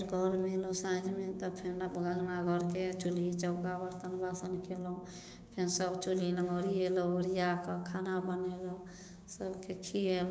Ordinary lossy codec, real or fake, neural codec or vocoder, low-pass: none; fake; codec, 16 kHz, 6 kbps, DAC; none